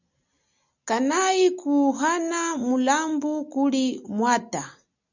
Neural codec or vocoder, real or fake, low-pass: none; real; 7.2 kHz